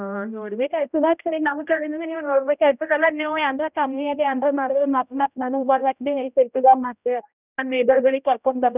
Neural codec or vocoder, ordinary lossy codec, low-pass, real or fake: codec, 16 kHz, 0.5 kbps, X-Codec, HuBERT features, trained on general audio; none; 3.6 kHz; fake